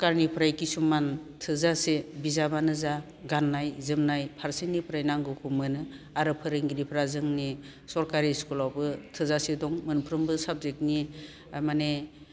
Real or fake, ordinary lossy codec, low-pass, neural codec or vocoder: real; none; none; none